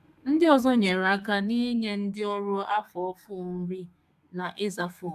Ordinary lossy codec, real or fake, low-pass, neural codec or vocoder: AAC, 96 kbps; fake; 14.4 kHz; codec, 32 kHz, 1.9 kbps, SNAC